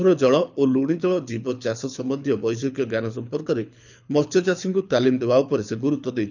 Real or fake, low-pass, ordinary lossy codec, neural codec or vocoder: fake; 7.2 kHz; none; codec, 24 kHz, 6 kbps, HILCodec